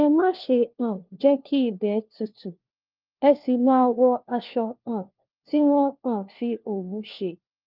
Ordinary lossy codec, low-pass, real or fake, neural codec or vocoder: Opus, 24 kbps; 5.4 kHz; fake; codec, 16 kHz, 1 kbps, FunCodec, trained on LibriTTS, 50 frames a second